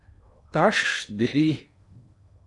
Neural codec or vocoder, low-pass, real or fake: codec, 16 kHz in and 24 kHz out, 0.8 kbps, FocalCodec, streaming, 65536 codes; 10.8 kHz; fake